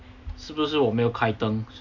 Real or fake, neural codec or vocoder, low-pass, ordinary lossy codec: real; none; 7.2 kHz; none